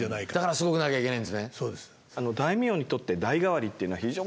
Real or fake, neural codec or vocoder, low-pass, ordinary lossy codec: real; none; none; none